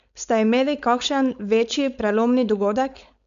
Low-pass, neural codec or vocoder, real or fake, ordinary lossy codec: 7.2 kHz; codec, 16 kHz, 4.8 kbps, FACodec; fake; none